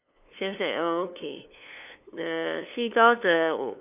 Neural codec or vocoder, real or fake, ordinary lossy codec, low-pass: codec, 16 kHz, 2 kbps, FunCodec, trained on LibriTTS, 25 frames a second; fake; none; 3.6 kHz